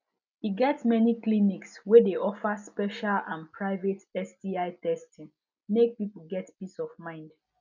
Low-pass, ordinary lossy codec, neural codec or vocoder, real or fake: 7.2 kHz; none; none; real